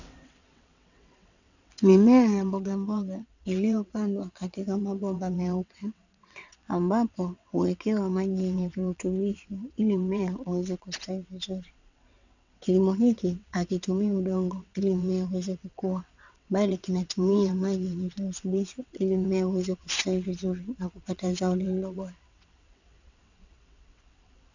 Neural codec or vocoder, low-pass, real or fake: vocoder, 22.05 kHz, 80 mel bands, WaveNeXt; 7.2 kHz; fake